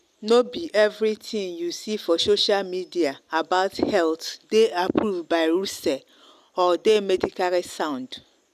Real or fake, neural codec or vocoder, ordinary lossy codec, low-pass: real; none; none; 14.4 kHz